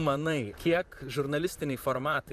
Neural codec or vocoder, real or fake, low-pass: vocoder, 44.1 kHz, 128 mel bands, Pupu-Vocoder; fake; 14.4 kHz